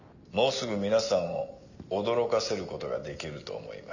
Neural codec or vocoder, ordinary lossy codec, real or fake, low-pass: none; none; real; 7.2 kHz